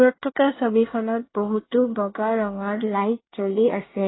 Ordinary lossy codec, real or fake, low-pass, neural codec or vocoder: AAC, 16 kbps; fake; 7.2 kHz; codec, 24 kHz, 1 kbps, SNAC